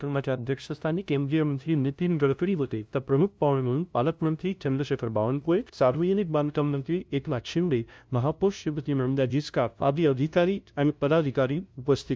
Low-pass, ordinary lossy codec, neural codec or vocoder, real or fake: none; none; codec, 16 kHz, 0.5 kbps, FunCodec, trained on LibriTTS, 25 frames a second; fake